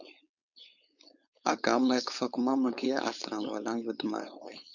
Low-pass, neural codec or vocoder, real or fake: 7.2 kHz; codec, 16 kHz, 4.8 kbps, FACodec; fake